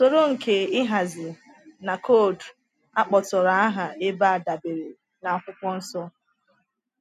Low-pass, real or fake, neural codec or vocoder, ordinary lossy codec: 14.4 kHz; real; none; none